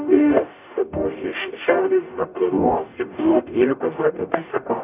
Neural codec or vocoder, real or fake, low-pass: codec, 44.1 kHz, 0.9 kbps, DAC; fake; 3.6 kHz